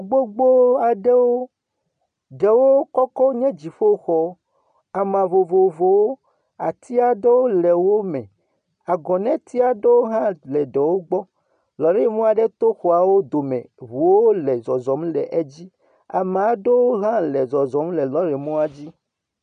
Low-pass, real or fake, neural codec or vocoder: 9.9 kHz; real; none